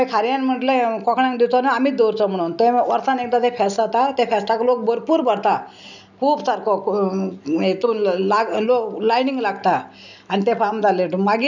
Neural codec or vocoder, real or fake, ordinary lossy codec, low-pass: none; real; none; 7.2 kHz